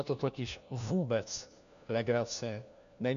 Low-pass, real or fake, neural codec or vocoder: 7.2 kHz; fake; codec, 16 kHz, 1 kbps, FunCodec, trained on LibriTTS, 50 frames a second